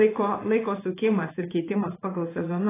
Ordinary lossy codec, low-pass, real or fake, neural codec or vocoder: AAC, 16 kbps; 3.6 kHz; fake; codec, 16 kHz in and 24 kHz out, 1 kbps, XY-Tokenizer